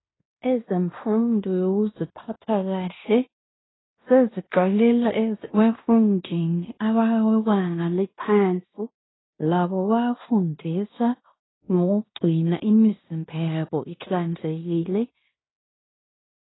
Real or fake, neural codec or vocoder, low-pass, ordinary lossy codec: fake; codec, 16 kHz in and 24 kHz out, 0.9 kbps, LongCat-Audio-Codec, fine tuned four codebook decoder; 7.2 kHz; AAC, 16 kbps